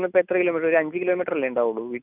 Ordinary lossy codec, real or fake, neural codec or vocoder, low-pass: none; real; none; 3.6 kHz